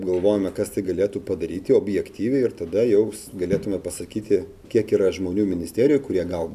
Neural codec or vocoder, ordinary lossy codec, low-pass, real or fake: none; MP3, 96 kbps; 14.4 kHz; real